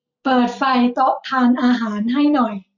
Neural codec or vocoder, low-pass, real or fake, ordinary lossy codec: none; 7.2 kHz; real; none